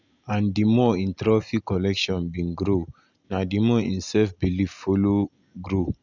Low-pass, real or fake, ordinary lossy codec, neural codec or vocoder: 7.2 kHz; real; none; none